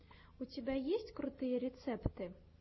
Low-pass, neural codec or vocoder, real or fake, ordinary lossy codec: 7.2 kHz; none; real; MP3, 24 kbps